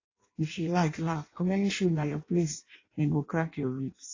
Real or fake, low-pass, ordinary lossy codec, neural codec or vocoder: fake; 7.2 kHz; AAC, 32 kbps; codec, 16 kHz in and 24 kHz out, 0.6 kbps, FireRedTTS-2 codec